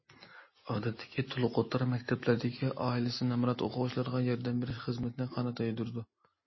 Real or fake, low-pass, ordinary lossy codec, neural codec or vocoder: real; 7.2 kHz; MP3, 24 kbps; none